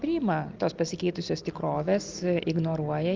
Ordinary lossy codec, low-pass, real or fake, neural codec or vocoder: Opus, 32 kbps; 7.2 kHz; real; none